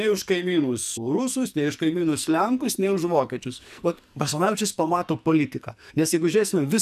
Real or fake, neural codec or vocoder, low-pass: fake; codec, 44.1 kHz, 2.6 kbps, SNAC; 14.4 kHz